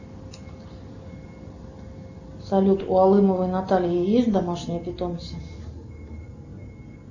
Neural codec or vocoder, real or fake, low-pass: none; real; 7.2 kHz